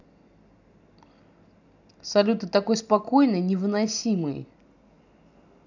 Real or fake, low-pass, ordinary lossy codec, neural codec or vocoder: real; 7.2 kHz; none; none